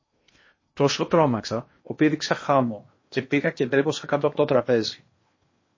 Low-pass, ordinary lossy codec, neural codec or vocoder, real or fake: 7.2 kHz; MP3, 32 kbps; codec, 16 kHz in and 24 kHz out, 0.8 kbps, FocalCodec, streaming, 65536 codes; fake